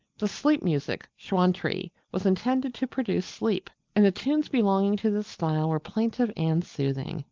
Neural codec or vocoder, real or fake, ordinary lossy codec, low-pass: none; real; Opus, 24 kbps; 7.2 kHz